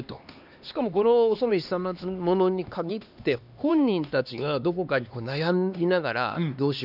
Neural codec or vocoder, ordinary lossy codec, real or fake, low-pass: codec, 16 kHz, 2 kbps, X-Codec, HuBERT features, trained on LibriSpeech; none; fake; 5.4 kHz